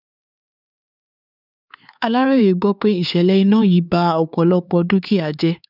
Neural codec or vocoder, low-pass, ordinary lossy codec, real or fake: codec, 16 kHz, 4 kbps, X-Codec, HuBERT features, trained on LibriSpeech; 5.4 kHz; none; fake